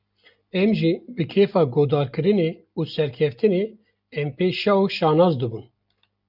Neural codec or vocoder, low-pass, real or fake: none; 5.4 kHz; real